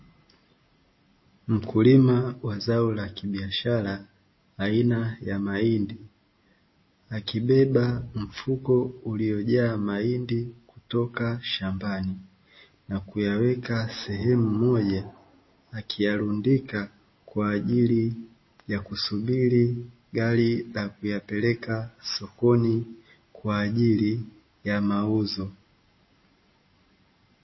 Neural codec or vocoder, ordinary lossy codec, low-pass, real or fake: none; MP3, 24 kbps; 7.2 kHz; real